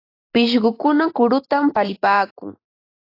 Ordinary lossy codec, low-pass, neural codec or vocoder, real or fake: AAC, 32 kbps; 5.4 kHz; none; real